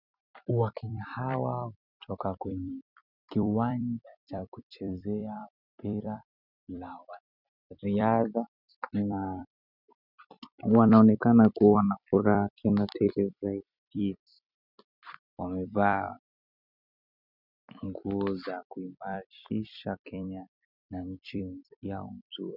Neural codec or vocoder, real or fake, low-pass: vocoder, 44.1 kHz, 128 mel bands every 256 samples, BigVGAN v2; fake; 5.4 kHz